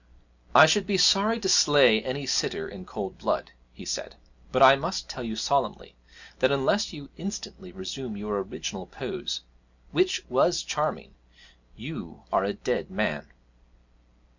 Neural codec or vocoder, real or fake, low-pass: none; real; 7.2 kHz